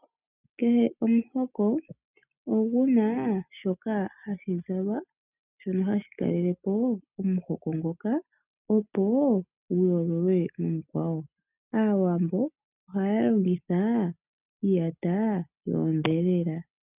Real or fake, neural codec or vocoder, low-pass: real; none; 3.6 kHz